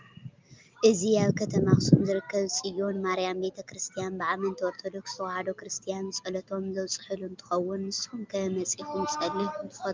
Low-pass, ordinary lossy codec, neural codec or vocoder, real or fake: 7.2 kHz; Opus, 24 kbps; none; real